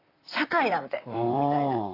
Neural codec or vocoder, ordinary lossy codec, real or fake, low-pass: vocoder, 44.1 kHz, 128 mel bands, Pupu-Vocoder; AAC, 24 kbps; fake; 5.4 kHz